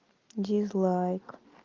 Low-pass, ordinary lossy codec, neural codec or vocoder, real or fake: 7.2 kHz; Opus, 32 kbps; none; real